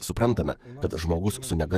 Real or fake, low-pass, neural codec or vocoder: fake; 14.4 kHz; codec, 44.1 kHz, 7.8 kbps, DAC